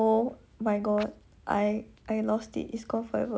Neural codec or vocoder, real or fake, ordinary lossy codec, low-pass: none; real; none; none